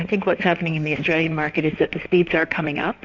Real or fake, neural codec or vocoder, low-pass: fake; codec, 16 kHz in and 24 kHz out, 2.2 kbps, FireRedTTS-2 codec; 7.2 kHz